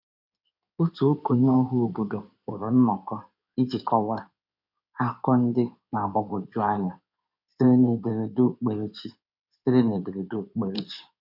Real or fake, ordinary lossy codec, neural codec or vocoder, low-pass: fake; none; codec, 16 kHz in and 24 kHz out, 2.2 kbps, FireRedTTS-2 codec; 5.4 kHz